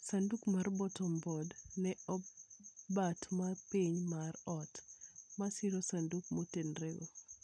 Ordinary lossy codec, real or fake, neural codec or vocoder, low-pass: none; real; none; 9.9 kHz